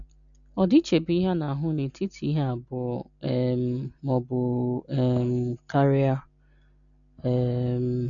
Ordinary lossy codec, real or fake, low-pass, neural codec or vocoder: none; real; 7.2 kHz; none